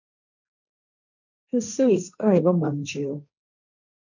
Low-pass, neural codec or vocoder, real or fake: 7.2 kHz; codec, 16 kHz, 1.1 kbps, Voila-Tokenizer; fake